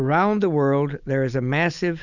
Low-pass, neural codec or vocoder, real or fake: 7.2 kHz; none; real